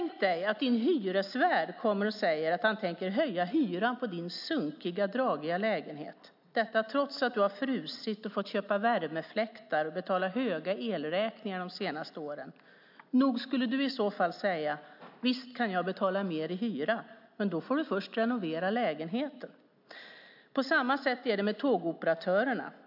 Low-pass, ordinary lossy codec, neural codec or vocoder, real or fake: 5.4 kHz; MP3, 48 kbps; none; real